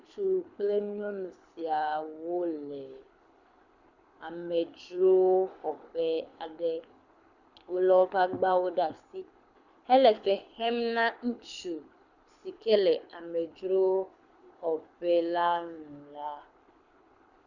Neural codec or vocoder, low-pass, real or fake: codec, 24 kHz, 6 kbps, HILCodec; 7.2 kHz; fake